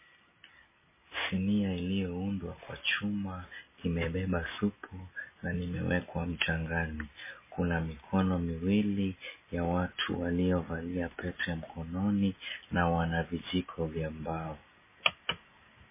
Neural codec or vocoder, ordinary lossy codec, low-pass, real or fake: none; MP3, 16 kbps; 3.6 kHz; real